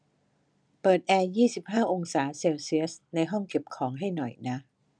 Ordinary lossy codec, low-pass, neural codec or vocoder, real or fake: none; 9.9 kHz; none; real